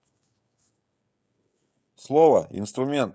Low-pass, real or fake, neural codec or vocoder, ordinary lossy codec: none; fake; codec, 16 kHz, 16 kbps, FreqCodec, smaller model; none